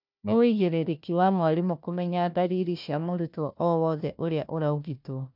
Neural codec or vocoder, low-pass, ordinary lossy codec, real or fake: codec, 16 kHz, 1 kbps, FunCodec, trained on Chinese and English, 50 frames a second; 5.4 kHz; none; fake